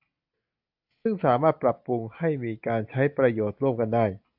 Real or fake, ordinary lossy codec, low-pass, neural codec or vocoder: real; AAC, 48 kbps; 5.4 kHz; none